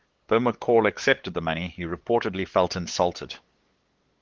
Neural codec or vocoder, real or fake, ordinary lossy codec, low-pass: codec, 16 kHz, 8 kbps, FunCodec, trained on LibriTTS, 25 frames a second; fake; Opus, 16 kbps; 7.2 kHz